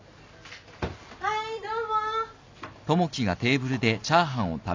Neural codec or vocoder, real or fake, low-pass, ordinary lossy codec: none; real; 7.2 kHz; MP3, 64 kbps